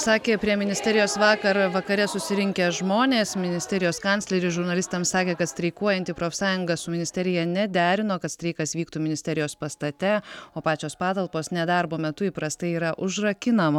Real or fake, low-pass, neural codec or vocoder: real; 19.8 kHz; none